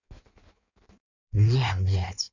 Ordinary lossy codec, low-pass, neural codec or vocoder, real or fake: none; 7.2 kHz; codec, 16 kHz in and 24 kHz out, 1.1 kbps, FireRedTTS-2 codec; fake